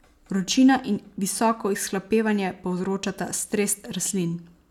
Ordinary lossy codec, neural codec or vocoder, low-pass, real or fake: none; none; 19.8 kHz; real